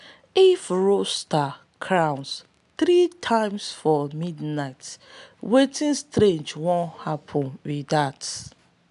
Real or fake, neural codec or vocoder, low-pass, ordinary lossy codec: real; none; 10.8 kHz; none